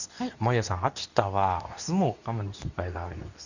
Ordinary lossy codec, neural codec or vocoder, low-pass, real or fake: none; codec, 24 kHz, 0.9 kbps, WavTokenizer, medium speech release version 2; 7.2 kHz; fake